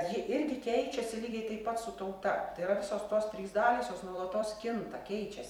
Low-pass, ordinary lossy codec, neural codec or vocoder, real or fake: 19.8 kHz; MP3, 96 kbps; vocoder, 44.1 kHz, 128 mel bands every 256 samples, BigVGAN v2; fake